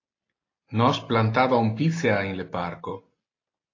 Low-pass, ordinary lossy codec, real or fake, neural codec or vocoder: 7.2 kHz; AAC, 32 kbps; real; none